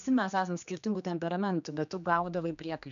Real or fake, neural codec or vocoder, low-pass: fake; codec, 16 kHz, 2 kbps, X-Codec, HuBERT features, trained on general audio; 7.2 kHz